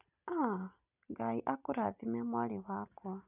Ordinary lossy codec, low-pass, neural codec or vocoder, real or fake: none; 3.6 kHz; none; real